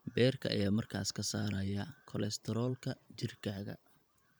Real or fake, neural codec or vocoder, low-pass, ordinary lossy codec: fake; vocoder, 44.1 kHz, 128 mel bands every 512 samples, BigVGAN v2; none; none